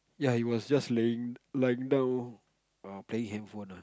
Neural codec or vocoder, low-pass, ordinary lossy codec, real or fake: none; none; none; real